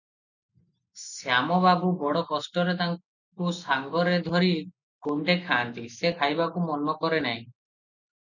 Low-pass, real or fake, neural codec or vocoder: 7.2 kHz; real; none